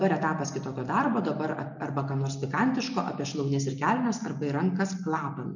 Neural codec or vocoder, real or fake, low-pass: none; real; 7.2 kHz